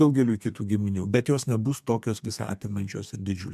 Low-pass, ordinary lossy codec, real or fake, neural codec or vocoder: 14.4 kHz; MP3, 96 kbps; fake; codec, 32 kHz, 1.9 kbps, SNAC